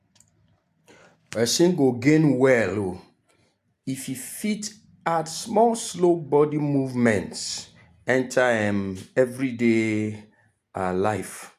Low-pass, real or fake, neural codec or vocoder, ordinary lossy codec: 14.4 kHz; real; none; AAC, 96 kbps